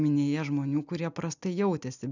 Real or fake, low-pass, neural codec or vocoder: real; 7.2 kHz; none